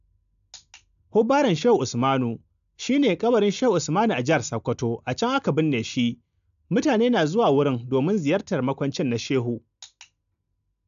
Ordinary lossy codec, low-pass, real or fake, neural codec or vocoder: AAC, 96 kbps; 7.2 kHz; real; none